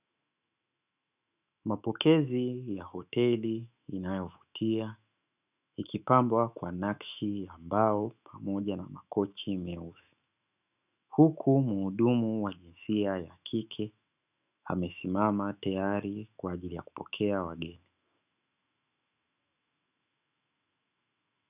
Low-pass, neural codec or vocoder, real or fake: 3.6 kHz; autoencoder, 48 kHz, 128 numbers a frame, DAC-VAE, trained on Japanese speech; fake